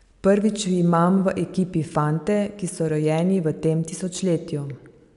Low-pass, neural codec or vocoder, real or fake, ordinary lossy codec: 10.8 kHz; none; real; none